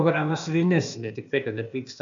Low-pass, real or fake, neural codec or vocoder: 7.2 kHz; fake; codec, 16 kHz, 0.8 kbps, ZipCodec